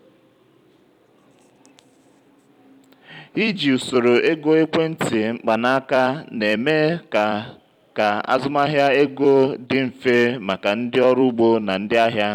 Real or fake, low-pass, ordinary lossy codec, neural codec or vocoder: fake; 19.8 kHz; none; vocoder, 44.1 kHz, 128 mel bands every 256 samples, BigVGAN v2